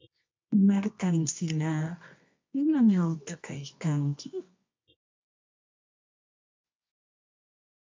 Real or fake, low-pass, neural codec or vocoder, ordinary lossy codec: fake; 7.2 kHz; codec, 24 kHz, 0.9 kbps, WavTokenizer, medium music audio release; MP3, 48 kbps